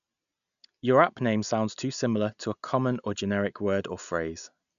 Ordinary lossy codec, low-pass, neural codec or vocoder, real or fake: none; 7.2 kHz; none; real